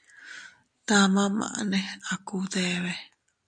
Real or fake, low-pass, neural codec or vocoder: real; 9.9 kHz; none